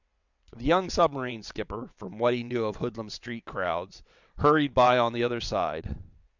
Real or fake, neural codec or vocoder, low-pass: fake; vocoder, 22.05 kHz, 80 mel bands, WaveNeXt; 7.2 kHz